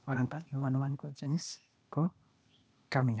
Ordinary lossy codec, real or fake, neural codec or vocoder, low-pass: none; fake; codec, 16 kHz, 0.8 kbps, ZipCodec; none